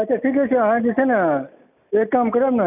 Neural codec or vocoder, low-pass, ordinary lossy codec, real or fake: none; 3.6 kHz; none; real